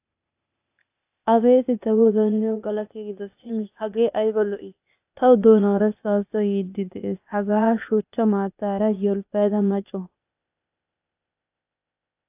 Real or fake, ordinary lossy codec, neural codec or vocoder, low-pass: fake; AAC, 32 kbps; codec, 16 kHz, 0.8 kbps, ZipCodec; 3.6 kHz